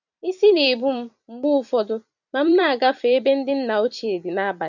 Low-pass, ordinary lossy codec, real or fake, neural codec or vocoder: 7.2 kHz; none; fake; vocoder, 44.1 kHz, 128 mel bands every 256 samples, BigVGAN v2